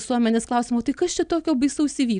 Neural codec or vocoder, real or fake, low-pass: none; real; 9.9 kHz